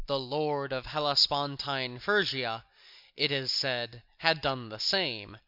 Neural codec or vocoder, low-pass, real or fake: none; 5.4 kHz; real